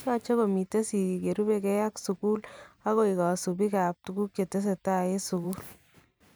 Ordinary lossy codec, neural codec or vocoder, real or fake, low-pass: none; vocoder, 44.1 kHz, 128 mel bands every 512 samples, BigVGAN v2; fake; none